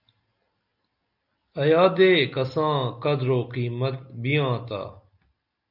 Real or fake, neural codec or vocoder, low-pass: real; none; 5.4 kHz